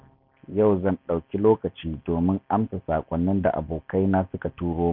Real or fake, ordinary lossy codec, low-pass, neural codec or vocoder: real; none; 5.4 kHz; none